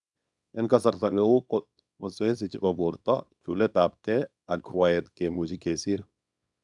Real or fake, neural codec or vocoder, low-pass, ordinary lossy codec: fake; codec, 24 kHz, 0.9 kbps, WavTokenizer, small release; 10.8 kHz; none